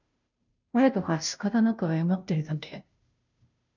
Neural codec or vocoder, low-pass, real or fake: codec, 16 kHz, 0.5 kbps, FunCodec, trained on Chinese and English, 25 frames a second; 7.2 kHz; fake